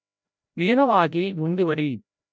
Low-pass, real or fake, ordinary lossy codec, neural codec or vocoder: none; fake; none; codec, 16 kHz, 0.5 kbps, FreqCodec, larger model